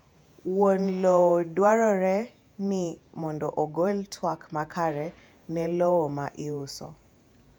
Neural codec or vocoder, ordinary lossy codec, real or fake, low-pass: vocoder, 48 kHz, 128 mel bands, Vocos; none; fake; 19.8 kHz